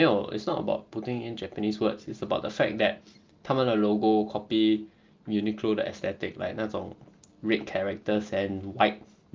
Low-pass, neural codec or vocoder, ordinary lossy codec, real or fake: 7.2 kHz; none; Opus, 32 kbps; real